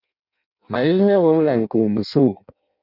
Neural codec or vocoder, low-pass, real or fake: codec, 16 kHz in and 24 kHz out, 0.6 kbps, FireRedTTS-2 codec; 5.4 kHz; fake